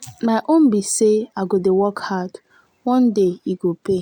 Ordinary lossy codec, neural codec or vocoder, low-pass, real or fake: none; none; 19.8 kHz; real